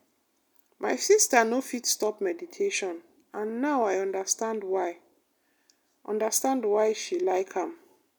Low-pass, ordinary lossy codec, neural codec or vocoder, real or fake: none; none; none; real